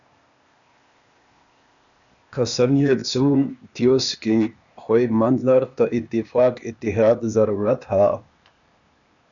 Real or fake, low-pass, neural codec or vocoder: fake; 7.2 kHz; codec, 16 kHz, 0.8 kbps, ZipCodec